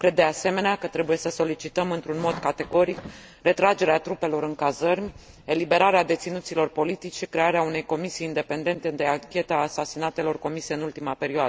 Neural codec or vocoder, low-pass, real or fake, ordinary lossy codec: none; none; real; none